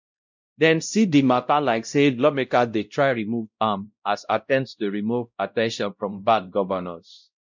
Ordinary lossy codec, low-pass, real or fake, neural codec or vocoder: MP3, 64 kbps; 7.2 kHz; fake; codec, 16 kHz, 0.5 kbps, X-Codec, WavLM features, trained on Multilingual LibriSpeech